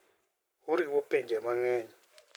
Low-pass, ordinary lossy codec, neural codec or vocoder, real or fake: none; none; codec, 44.1 kHz, 7.8 kbps, Pupu-Codec; fake